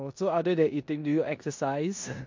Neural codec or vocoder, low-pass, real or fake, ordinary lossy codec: codec, 16 kHz in and 24 kHz out, 0.9 kbps, LongCat-Audio-Codec, four codebook decoder; 7.2 kHz; fake; MP3, 48 kbps